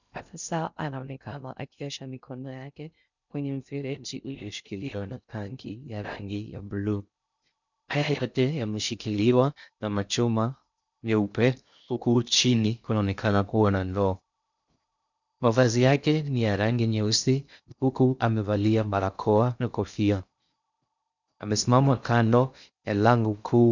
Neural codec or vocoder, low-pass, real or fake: codec, 16 kHz in and 24 kHz out, 0.6 kbps, FocalCodec, streaming, 2048 codes; 7.2 kHz; fake